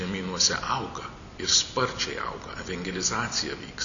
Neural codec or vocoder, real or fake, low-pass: none; real; 7.2 kHz